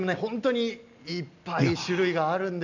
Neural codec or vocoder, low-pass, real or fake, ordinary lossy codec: none; 7.2 kHz; real; none